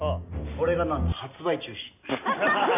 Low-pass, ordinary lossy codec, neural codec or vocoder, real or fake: 3.6 kHz; none; none; real